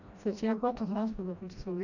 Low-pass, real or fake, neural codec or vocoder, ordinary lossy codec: 7.2 kHz; fake; codec, 16 kHz, 1 kbps, FreqCodec, smaller model; none